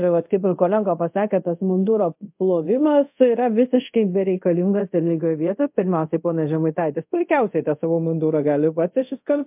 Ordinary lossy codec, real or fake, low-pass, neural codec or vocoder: MP3, 32 kbps; fake; 3.6 kHz; codec, 24 kHz, 0.5 kbps, DualCodec